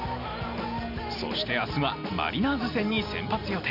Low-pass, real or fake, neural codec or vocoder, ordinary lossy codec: 5.4 kHz; real; none; none